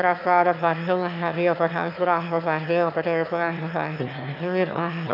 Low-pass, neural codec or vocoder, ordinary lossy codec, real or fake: 5.4 kHz; autoencoder, 22.05 kHz, a latent of 192 numbers a frame, VITS, trained on one speaker; none; fake